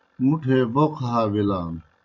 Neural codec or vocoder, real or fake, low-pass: none; real; 7.2 kHz